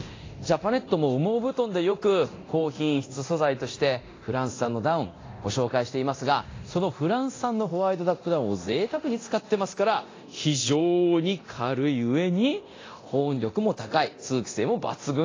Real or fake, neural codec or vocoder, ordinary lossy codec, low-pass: fake; codec, 24 kHz, 0.9 kbps, DualCodec; AAC, 32 kbps; 7.2 kHz